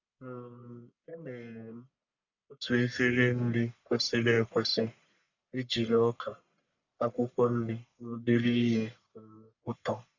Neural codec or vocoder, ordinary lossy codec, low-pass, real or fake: codec, 44.1 kHz, 1.7 kbps, Pupu-Codec; none; 7.2 kHz; fake